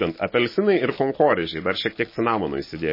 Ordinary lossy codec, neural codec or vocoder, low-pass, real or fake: MP3, 24 kbps; none; 5.4 kHz; real